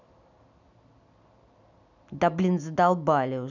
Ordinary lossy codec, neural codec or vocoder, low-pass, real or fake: none; none; 7.2 kHz; real